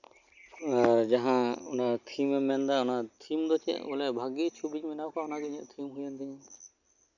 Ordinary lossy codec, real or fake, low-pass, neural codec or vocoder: none; real; 7.2 kHz; none